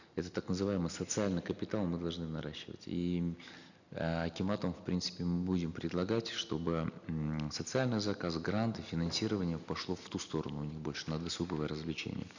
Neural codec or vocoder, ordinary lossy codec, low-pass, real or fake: none; none; 7.2 kHz; real